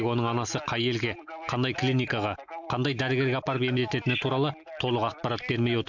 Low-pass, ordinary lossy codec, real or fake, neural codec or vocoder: 7.2 kHz; none; real; none